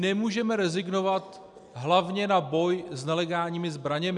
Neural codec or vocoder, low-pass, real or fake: none; 10.8 kHz; real